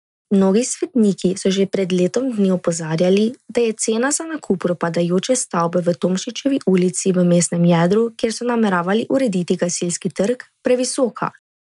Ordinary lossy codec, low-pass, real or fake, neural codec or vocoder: none; 10.8 kHz; real; none